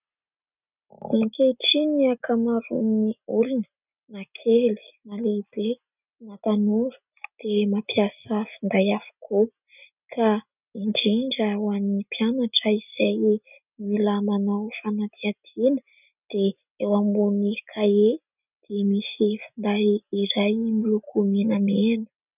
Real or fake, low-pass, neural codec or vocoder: real; 3.6 kHz; none